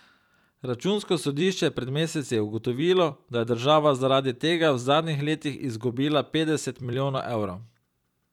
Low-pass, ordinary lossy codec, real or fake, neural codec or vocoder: 19.8 kHz; none; fake; vocoder, 44.1 kHz, 128 mel bands every 256 samples, BigVGAN v2